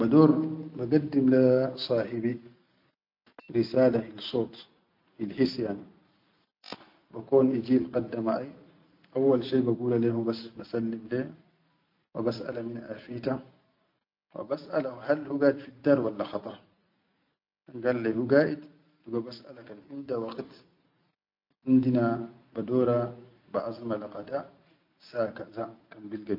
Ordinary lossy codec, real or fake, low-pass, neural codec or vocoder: MP3, 48 kbps; real; 5.4 kHz; none